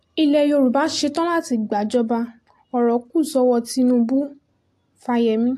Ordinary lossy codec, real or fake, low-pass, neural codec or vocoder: AAC, 64 kbps; real; 14.4 kHz; none